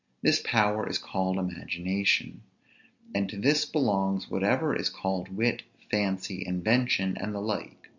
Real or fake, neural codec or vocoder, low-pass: real; none; 7.2 kHz